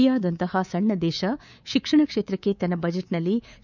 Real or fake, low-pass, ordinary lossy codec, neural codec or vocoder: fake; 7.2 kHz; none; codec, 24 kHz, 3.1 kbps, DualCodec